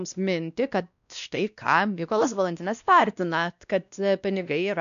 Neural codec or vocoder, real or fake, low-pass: codec, 16 kHz, 1 kbps, X-Codec, WavLM features, trained on Multilingual LibriSpeech; fake; 7.2 kHz